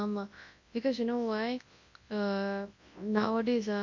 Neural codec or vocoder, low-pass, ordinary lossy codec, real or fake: codec, 24 kHz, 0.9 kbps, WavTokenizer, large speech release; 7.2 kHz; MP3, 48 kbps; fake